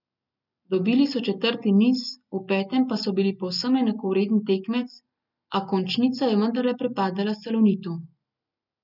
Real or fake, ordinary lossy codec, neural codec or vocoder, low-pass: real; none; none; 5.4 kHz